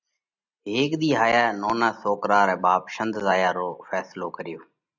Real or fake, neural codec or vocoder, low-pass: real; none; 7.2 kHz